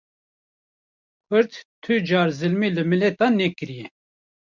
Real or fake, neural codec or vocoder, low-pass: real; none; 7.2 kHz